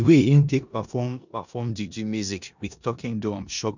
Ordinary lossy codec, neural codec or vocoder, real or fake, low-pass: none; codec, 16 kHz in and 24 kHz out, 0.9 kbps, LongCat-Audio-Codec, four codebook decoder; fake; 7.2 kHz